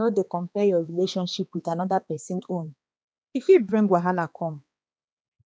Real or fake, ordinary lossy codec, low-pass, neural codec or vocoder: fake; none; none; codec, 16 kHz, 2 kbps, X-Codec, HuBERT features, trained on balanced general audio